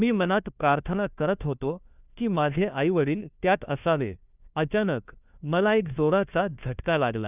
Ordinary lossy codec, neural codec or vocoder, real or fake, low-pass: none; codec, 16 kHz, 1 kbps, FunCodec, trained on LibriTTS, 50 frames a second; fake; 3.6 kHz